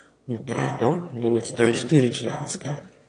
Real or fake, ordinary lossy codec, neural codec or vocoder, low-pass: fake; AAC, 48 kbps; autoencoder, 22.05 kHz, a latent of 192 numbers a frame, VITS, trained on one speaker; 9.9 kHz